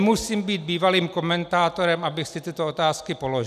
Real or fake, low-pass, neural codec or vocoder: real; 14.4 kHz; none